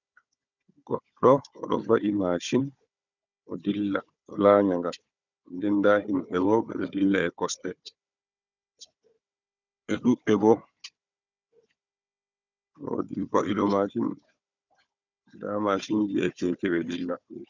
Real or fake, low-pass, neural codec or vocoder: fake; 7.2 kHz; codec, 16 kHz, 4 kbps, FunCodec, trained on Chinese and English, 50 frames a second